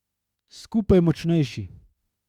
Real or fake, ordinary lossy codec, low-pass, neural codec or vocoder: fake; Opus, 64 kbps; 19.8 kHz; autoencoder, 48 kHz, 32 numbers a frame, DAC-VAE, trained on Japanese speech